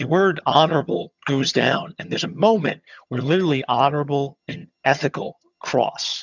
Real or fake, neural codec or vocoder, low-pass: fake; vocoder, 22.05 kHz, 80 mel bands, HiFi-GAN; 7.2 kHz